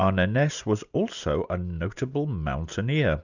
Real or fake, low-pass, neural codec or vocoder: real; 7.2 kHz; none